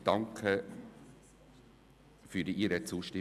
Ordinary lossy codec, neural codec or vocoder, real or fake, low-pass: none; none; real; 14.4 kHz